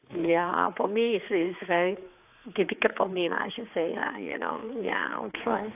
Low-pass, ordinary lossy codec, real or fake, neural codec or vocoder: 3.6 kHz; AAC, 32 kbps; fake; codec, 16 kHz, 4 kbps, X-Codec, HuBERT features, trained on general audio